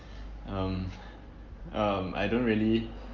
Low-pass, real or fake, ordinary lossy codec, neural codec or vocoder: 7.2 kHz; real; Opus, 24 kbps; none